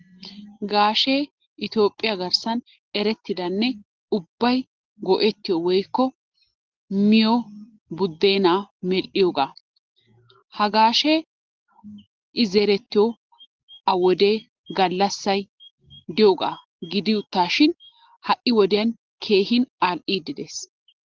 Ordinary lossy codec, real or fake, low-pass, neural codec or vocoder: Opus, 16 kbps; real; 7.2 kHz; none